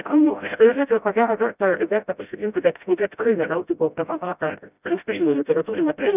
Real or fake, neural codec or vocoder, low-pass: fake; codec, 16 kHz, 0.5 kbps, FreqCodec, smaller model; 3.6 kHz